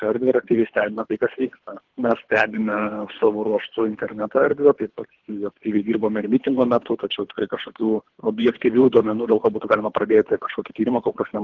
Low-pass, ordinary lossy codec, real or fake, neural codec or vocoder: 7.2 kHz; Opus, 16 kbps; fake; codec, 24 kHz, 3 kbps, HILCodec